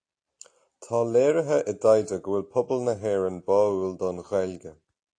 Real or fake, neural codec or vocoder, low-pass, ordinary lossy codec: real; none; 9.9 kHz; AAC, 48 kbps